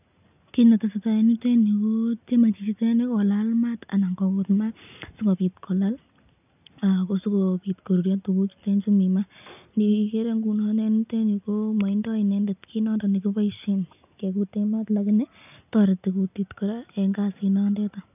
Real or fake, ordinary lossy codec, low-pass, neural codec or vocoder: real; none; 3.6 kHz; none